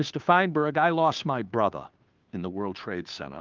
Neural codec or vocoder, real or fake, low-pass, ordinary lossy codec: codec, 16 kHz, 2 kbps, FunCodec, trained on Chinese and English, 25 frames a second; fake; 7.2 kHz; Opus, 32 kbps